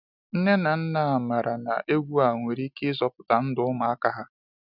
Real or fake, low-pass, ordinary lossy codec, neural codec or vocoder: real; 5.4 kHz; none; none